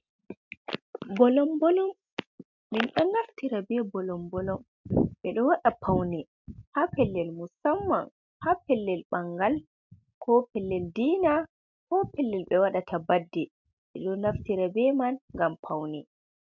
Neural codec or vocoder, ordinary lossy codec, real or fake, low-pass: none; MP3, 64 kbps; real; 7.2 kHz